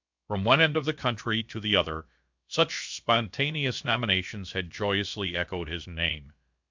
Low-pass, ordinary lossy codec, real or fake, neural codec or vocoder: 7.2 kHz; MP3, 64 kbps; fake; codec, 16 kHz, about 1 kbps, DyCAST, with the encoder's durations